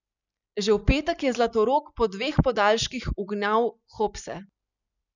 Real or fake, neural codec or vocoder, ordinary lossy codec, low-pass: real; none; none; 7.2 kHz